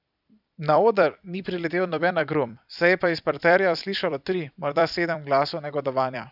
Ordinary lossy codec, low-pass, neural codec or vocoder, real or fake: none; 5.4 kHz; vocoder, 44.1 kHz, 128 mel bands every 256 samples, BigVGAN v2; fake